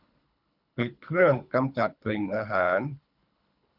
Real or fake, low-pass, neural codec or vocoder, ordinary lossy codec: fake; 5.4 kHz; codec, 24 kHz, 3 kbps, HILCodec; none